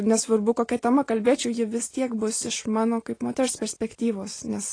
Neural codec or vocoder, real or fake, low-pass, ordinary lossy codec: none; real; 9.9 kHz; AAC, 32 kbps